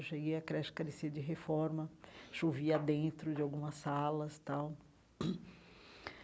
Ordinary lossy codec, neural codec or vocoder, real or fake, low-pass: none; none; real; none